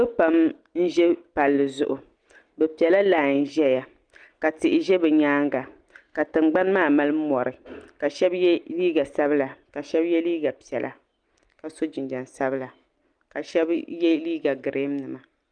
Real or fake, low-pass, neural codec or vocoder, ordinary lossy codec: real; 9.9 kHz; none; Opus, 32 kbps